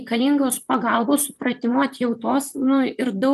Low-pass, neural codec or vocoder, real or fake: 14.4 kHz; vocoder, 44.1 kHz, 128 mel bands, Pupu-Vocoder; fake